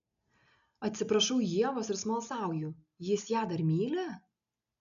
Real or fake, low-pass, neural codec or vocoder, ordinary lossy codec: real; 7.2 kHz; none; MP3, 96 kbps